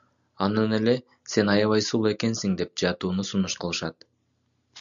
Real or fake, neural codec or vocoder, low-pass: real; none; 7.2 kHz